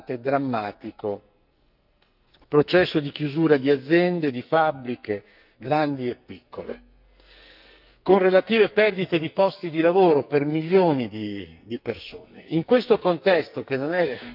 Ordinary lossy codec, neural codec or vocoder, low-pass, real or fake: none; codec, 44.1 kHz, 2.6 kbps, SNAC; 5.4 kHz; fake